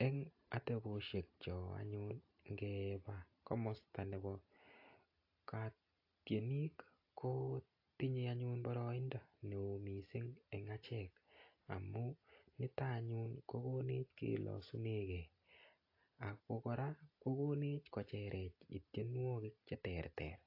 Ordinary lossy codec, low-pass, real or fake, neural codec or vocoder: AAC, 32 kbps; 5.4 kHz; real; none